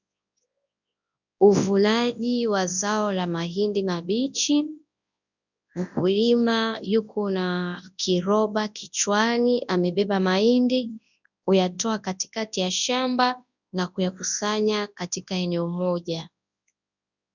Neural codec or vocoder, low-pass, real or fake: codec, 24 kHz, 0.9 kbps, WavTokenizer, large speech release; 7.2 kHz; fake